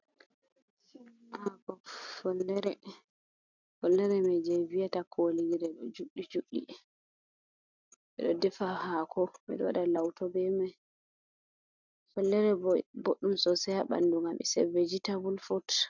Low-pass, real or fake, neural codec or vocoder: 7.2 kHz; real; none